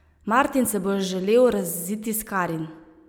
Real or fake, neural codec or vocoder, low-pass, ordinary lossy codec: real; none; none; none